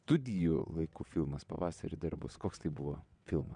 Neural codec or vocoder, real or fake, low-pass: vocoder, 22.05 kHz, 80 mel bands, WaveNeXt; fake; 9.9 kHz